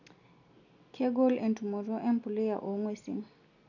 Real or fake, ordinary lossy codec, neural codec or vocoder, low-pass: real; none; none; 7.2 kHz